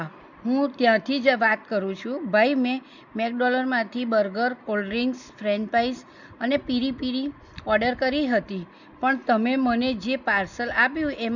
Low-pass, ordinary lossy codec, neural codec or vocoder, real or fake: 7.2 kHz; none; none; real